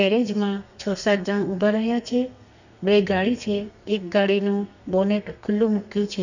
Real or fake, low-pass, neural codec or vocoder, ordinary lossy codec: fake; 7.2 kHz; codec, 32 kHz, 1.9 kbps, SNAC; none